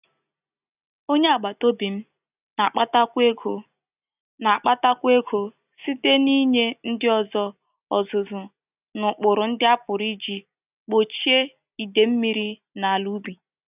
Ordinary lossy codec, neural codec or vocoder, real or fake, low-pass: none; none; real; 3.6 kHz